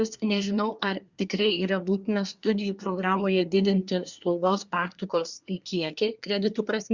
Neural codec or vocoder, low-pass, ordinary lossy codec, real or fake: codec, 24 kHz, 1 kbps, SNAC; 7.2 kHz; Opus, 64 kbps; fake